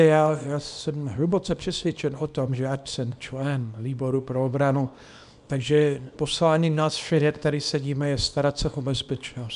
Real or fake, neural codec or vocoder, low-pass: fake; codec, 24 kHz, 0.9 kbps, WavTokenizer, small release; 10.8 kHz